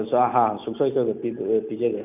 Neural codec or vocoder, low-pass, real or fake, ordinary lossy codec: none; 3.6 kHz; real; none